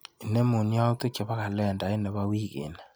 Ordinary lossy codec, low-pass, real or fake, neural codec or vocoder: none; none; real; none